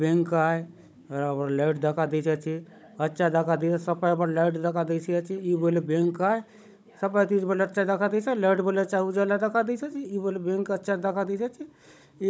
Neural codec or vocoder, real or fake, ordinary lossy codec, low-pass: codec, 16 kHz, 16 kbps, FunCodec, trained on Chinese and English, 50 frames a second; fake; none; none